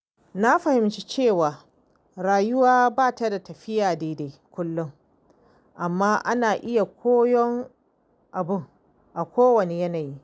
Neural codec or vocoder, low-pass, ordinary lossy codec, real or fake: none; none; none; real